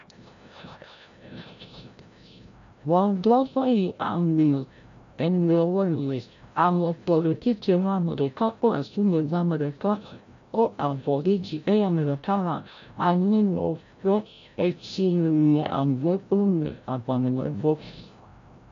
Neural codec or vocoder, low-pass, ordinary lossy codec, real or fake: codec, 16 kHz, 0.5 kbps, FreqCodec, larger model; 7.2 kHz; AAC, 64 kbps; fake